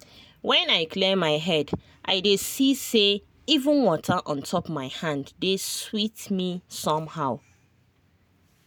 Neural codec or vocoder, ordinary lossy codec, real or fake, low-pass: none; none; real; none